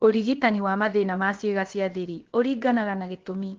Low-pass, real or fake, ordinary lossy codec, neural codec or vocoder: 7.2 kHz; fake; Opus, 16 kbps; codec, 16 kHz, about 1 kbps, DyCAST, with the encoder's durations